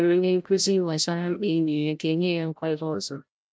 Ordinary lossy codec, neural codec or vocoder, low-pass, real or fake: none; codec, 16 kHz, 0.5 kbps, FreqCodec, larger model; none; fake